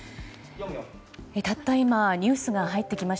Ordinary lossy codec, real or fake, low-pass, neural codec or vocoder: none; real; none; none